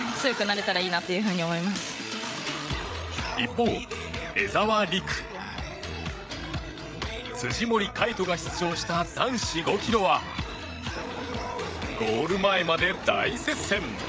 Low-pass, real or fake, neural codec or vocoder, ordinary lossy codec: none; fake; codec, 16 kHz, 8 kbps, FreqCodec, larger model; none